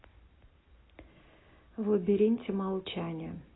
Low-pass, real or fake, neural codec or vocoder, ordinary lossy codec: 7.2 kHz; real; none; AAC, 16 kbps